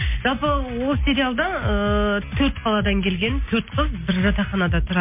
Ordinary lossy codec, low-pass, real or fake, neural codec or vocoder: MP3, 24 kbps; 3.6 kHz; real; none